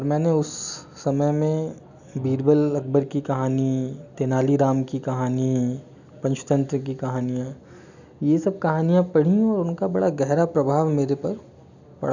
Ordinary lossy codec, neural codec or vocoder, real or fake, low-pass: none; none; real; 7.2 kHz